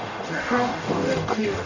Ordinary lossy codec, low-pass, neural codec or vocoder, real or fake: none; 7.2 kHz; codec, 44.1 kHz, 0.9 kbps, DAC; fake